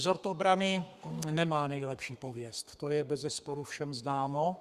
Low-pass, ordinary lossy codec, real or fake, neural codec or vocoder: 14.4 kHz; Opus, 64 kbps; fake; codec, 32 kHz, 1.9 kbps, SNAC